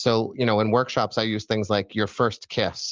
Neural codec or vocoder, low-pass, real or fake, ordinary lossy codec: none; 7.2 kHz; real; Opus, 24 kbps